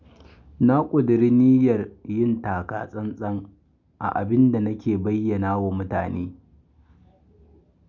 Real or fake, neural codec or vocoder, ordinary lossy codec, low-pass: real; none; none; 7.2 kHz